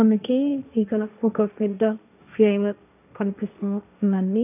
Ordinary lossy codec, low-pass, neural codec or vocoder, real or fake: none; 3.6 kHz; codec, 16 kHz, 1.1 kbps, Voila-Tokenizer; fake